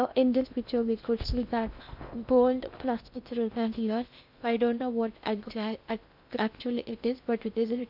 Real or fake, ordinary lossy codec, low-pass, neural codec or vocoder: fake; none; 5.4 kHz; codec, 16 kHz in and 24 kHz out, 0.6 kbps, FocalCodec, streaming, 2048 codes